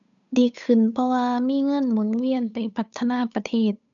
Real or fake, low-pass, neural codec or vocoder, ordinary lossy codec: fake; 7.2 kHz; codec, 16 kHz, 8 kbps, FunCodec, trained on Chinese and English, 25 frames a second; none